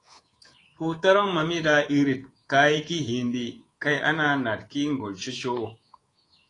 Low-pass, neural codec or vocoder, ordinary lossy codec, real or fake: 10.8 kHz; codec, 24 kHz, 3.1 kbps, DualCodec; AAC, 32 kbps; fake